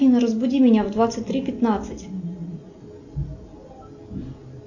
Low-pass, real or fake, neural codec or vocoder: 7.2 kHz; real; none